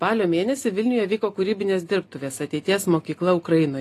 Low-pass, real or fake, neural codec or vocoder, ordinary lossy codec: 14.4 kHz; real; none; AAC, 48 kbps